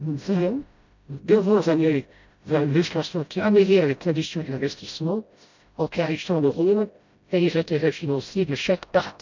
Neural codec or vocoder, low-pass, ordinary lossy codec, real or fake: codec, 16 kHz, 0.5 kbps, FreqCodec, smaller model; 7.2 kHz; MP3, 64 kbps; fake